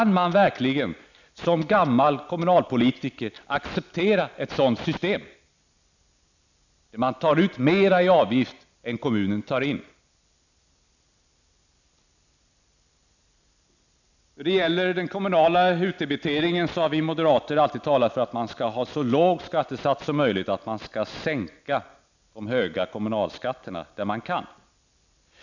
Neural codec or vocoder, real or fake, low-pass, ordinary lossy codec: none; real; 7.2 kHz; none